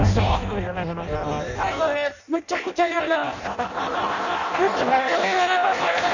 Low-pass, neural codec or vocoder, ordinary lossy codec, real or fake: 7.2 kHz; codec, 16 kHz in and 24 kHz out, 0.6 kbps, FireRedTTS-2 codec; none; fake